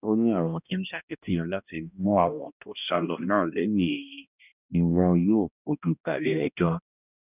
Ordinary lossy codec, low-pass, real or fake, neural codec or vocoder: none; 3.6 kHz; fake; codec, 16 kHz, 1 kbps, X-Codec, HuBERT features, trained on balanced general audio